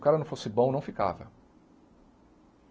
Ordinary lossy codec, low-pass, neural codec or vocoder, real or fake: none; none; none; real